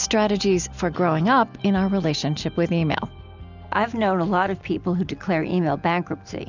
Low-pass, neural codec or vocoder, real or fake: 7.2 kHz; none; real